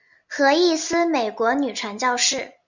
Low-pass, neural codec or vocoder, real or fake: 7.2 kHz; none; real